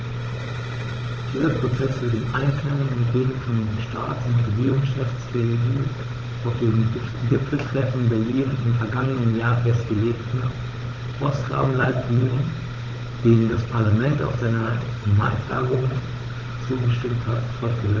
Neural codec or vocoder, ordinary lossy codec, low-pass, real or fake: codec, 16 kHz, 16 kbps, FunCodec, trained on Chinese and English, 50 frames a second; Opus, 16 kbps; 7.2 kHz; fake